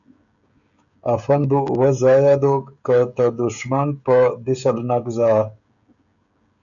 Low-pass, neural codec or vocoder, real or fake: 7.2 kHz; codec, 16 kHz, 16 kbps, FreqCodec, smaller model; fake